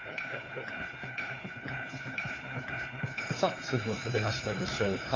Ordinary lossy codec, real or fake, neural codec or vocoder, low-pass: AAC, 32 kbps; fake; codec, 16 kHz, 4 kbps, FunCodec, trained on LibriTTS, 50 frames a second; 7.2 kHz